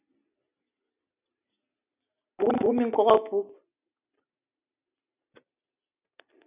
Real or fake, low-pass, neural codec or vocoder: real; 3.6 kHz; none